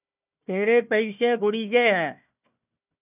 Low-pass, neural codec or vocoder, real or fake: 3.6 kHz; codec, 16 kHz, 1 kbps, FunCodec, trained on Chinese and English, 50 frames a second; fake